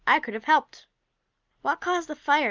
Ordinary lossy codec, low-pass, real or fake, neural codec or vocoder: Opus, 24 kbps; 7.2 kHz; real; none